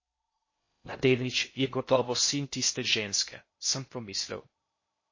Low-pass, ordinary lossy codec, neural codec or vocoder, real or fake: 7.2 kHz; MP3, 32 kbps; codec, 16 kHz in and 24 kHz out, 0.6 kbps, FocalCodec, streaming, 4096 codes; fake